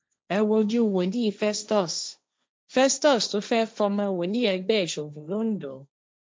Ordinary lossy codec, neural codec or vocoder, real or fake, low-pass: none; codec, 16 kHz, 1.1 kbps, Voila-Tokenizer; fake; none